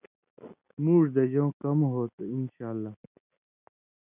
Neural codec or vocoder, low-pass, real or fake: none; 3.6 kHz; real